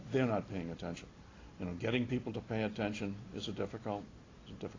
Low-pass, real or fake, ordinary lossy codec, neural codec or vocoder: 7.2 kHz; real; AAC, 32 kbps; none